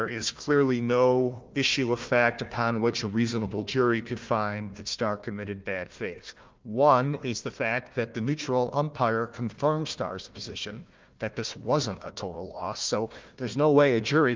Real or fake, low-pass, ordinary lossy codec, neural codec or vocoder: fake; 7.2 kHz; Opus, 24 kbps; codec, 16 kHz, 1 kbps, FunCodec, trained on Chinese and English, 50 frames a second